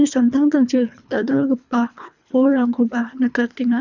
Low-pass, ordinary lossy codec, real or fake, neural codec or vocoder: 7.2 kHz; none; fake; codec, 24 kHz, 3 kbps, HILCodec